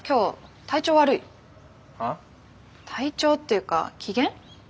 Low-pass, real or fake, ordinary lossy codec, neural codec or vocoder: none; real; none; none